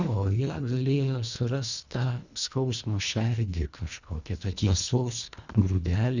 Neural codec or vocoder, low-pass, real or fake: codec, 24 kHz, 1.5 kbps, HILCodec; 7.2 kHz; fake